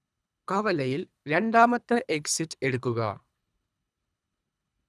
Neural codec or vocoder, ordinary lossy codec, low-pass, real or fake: codec, 24 kHz, 3 kbps, HILCodec; none; none; fake